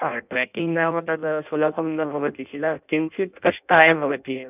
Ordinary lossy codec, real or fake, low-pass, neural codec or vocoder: none; fake; 3.6 kHz; codec, 16 kHz in and 24 kHz out, 0.6 kbps, FireRedTTS-2 codec